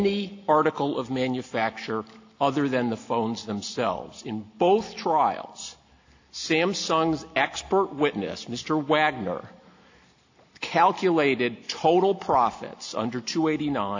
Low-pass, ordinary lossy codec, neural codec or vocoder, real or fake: 7.2 kHz; AAC, 48 kbps; none; real